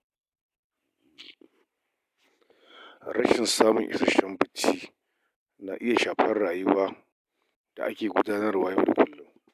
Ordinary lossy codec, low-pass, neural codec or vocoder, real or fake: none; 14.4 kHz; none; real